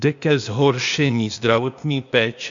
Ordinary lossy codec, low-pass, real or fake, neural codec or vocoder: AAC, 64 kbps; 7.2 kHz; fake; codec, 16 kHz, 0.8 kbps, ZipCodec